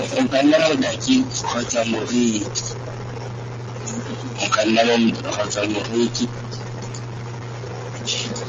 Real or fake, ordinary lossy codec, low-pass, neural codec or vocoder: real; Opus, 32 kbps; 7.2 kHz; none